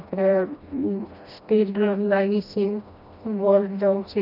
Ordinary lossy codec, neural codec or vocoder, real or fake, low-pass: none; codec, 16 kHz, 1 kbps, FreqCodec, smaller model; fake; 5.4 kHz